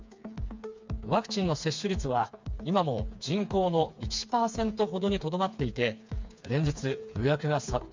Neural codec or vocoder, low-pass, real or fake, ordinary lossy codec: codec, 16 kHz, 4 kbps, FreqCodec, smaller model; 7.2 kHz; fake; MP3, 64 kbps